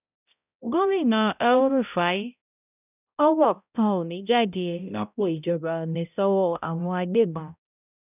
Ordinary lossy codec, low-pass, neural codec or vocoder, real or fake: none; 3.6 kHz; codec, 16 kHz, 0.5 kbps, X-Codec, HuBERT features, trained on balanced general audio; fake